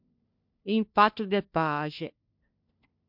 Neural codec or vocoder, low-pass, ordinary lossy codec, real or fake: codec, 16 kHz, 1 kbps, FunCodec, trained on LibriTTS, 50 frames a second; 5.4 kHz; MP3, 48 kbps; fake